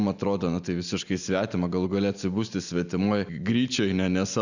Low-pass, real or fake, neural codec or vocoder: 7.2 kHz; real; none